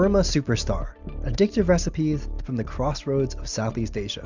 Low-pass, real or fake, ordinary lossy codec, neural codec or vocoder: 7.2 kHz; real; Opus, 64 kbps; none